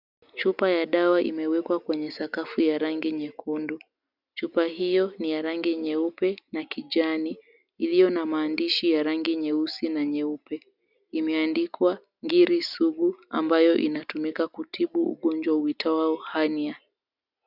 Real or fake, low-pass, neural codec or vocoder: real; 5.4 kHz; none